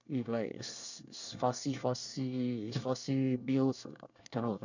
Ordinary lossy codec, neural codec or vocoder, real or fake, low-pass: none; codec, 24 kHz, 1 kbps, SNAC; fake; 7.2 kHz